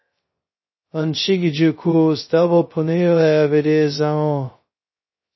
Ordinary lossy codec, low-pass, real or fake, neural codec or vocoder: MP3, 24 kbps; 7.2 kHz; fake; codec, 16 kHz, 0.2 kbps, FocalCodec